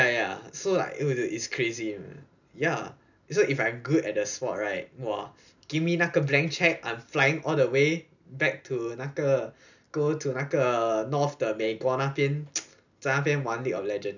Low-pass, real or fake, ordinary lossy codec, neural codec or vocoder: 7.2 kHz; real; none; none